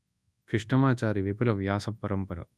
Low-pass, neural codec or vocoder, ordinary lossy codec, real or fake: none; codec, 24 kHz, 0.9 kbps, WavTokenizer, large speech release; none; fake